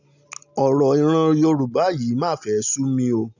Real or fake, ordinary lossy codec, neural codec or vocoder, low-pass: real; none; none; 7.2 kHz